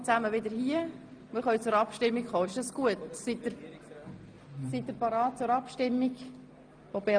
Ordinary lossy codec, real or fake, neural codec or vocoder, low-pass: Opus, 32 kbps; real; none; 9.9 kHz